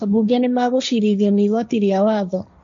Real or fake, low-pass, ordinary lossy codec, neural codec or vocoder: fake; 7.2 kHz; none; codec, 16 kHz, 1.1 kbps, Voila-Tokenizer